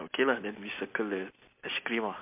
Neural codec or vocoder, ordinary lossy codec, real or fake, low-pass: none; MP3, 32 kbps; real; 3.6 kHz